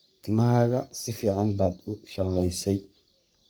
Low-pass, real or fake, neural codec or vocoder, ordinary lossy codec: none; fake; codec, 44.1 kHz, 3.4 kbps, Pupu-Codec; none